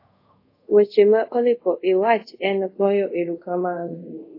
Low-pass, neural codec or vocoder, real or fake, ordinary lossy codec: 5.4 kHz; codec, 24 kHz, 0.5 kbps, DualCodec; fake; AAC, 32 kbps